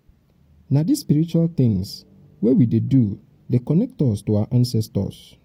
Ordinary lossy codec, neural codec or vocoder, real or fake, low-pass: AAC, 48 kbps; none; real; 19.8 kHz